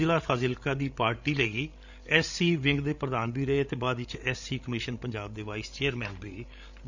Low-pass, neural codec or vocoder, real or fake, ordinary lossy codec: 7.2 kHz; codec, 16 kHz, 16 kbps, FreqCodec, larger model; fake; none